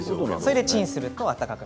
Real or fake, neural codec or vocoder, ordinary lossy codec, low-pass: real; none; none; none